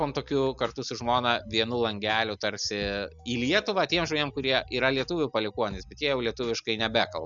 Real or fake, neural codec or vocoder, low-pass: real; none; 7.2 kHz